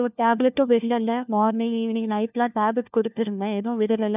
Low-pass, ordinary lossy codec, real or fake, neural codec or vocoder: 3.6 kHz; none; fake; codec, 16 kHz, 1 kbps, FunCodec, trained on LibriTTS, 50 frames a second